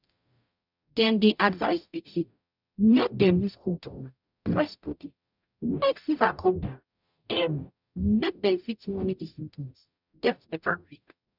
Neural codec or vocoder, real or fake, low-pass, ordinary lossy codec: codec, 44.1 kHz, 0.9 kbps, DAC; fake; 5.4 kHz; none